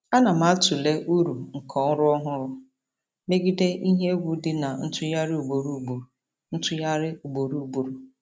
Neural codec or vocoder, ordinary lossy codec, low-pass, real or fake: none; none; none; real